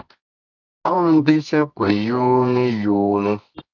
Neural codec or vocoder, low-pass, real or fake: codec, 24 kHz, 0.9 kbps, WavTokenizer, medium music audio release; 7.2 kHz; fake